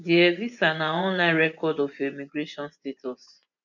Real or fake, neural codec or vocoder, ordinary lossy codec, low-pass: fake; vocoder, 24 kHz, 100 mel bands, Vocos; none; 7.2 kHz